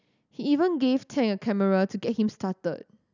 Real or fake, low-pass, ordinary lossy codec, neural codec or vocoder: real; 7.2 kHz; none; none